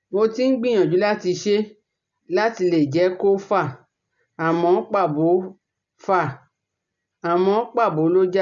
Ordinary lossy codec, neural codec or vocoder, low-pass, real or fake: none; none; 7.2 kHz; real